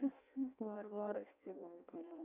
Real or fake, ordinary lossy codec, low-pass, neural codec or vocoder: fake; none; 3.6 kHz; codec, 16 kHz in and 24 kHz out, 0.6 kbps, FireRedTTS-2 codec